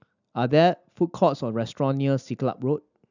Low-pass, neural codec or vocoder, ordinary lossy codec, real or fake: 7.2 kHz; none; none; real